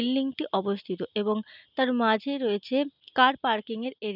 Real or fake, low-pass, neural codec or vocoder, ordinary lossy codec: real; 5.4 kHz; none; none